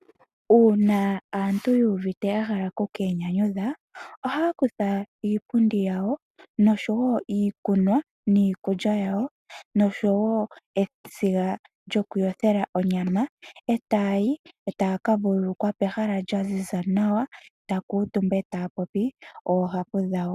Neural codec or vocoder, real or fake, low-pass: none; real; 14.4 kHz